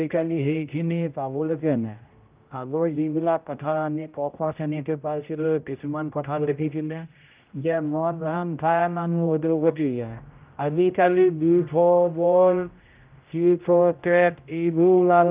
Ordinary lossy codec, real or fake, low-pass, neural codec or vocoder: Opus, 24 kbps; fake; 3.6 kHz; codec, 16 kHz, 0.5 kbps, X-Codec, HuBERT features, trained on general audio